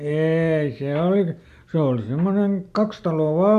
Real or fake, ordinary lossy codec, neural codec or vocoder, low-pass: real; AAC, 96 kbps; none; 14.4 kHz